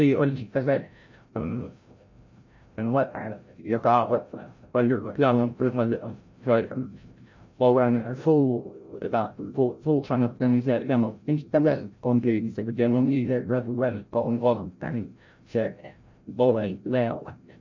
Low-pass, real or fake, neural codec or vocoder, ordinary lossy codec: 7.2 kHz; fake; codec, 16 kHz, 0.5 kbps, FreqCodec, larger model; MP3, 48 kbps